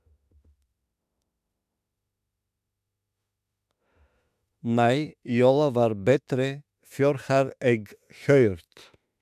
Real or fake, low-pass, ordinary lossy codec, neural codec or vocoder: fake; 14.4 kHz; none; autoencoder, 48 kHz, 32 numbers a frame, DAC-VAE, trained on Japanese speech